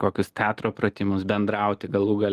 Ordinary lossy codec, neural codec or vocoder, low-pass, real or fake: Opus, 24 kbps; none; 14.4 kHz; real